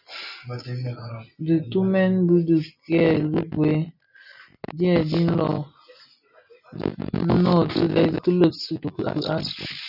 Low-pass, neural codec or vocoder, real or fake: 5.4 kHz; none; real